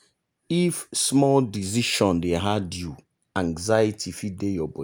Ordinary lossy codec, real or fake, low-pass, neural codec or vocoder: none; real; none; none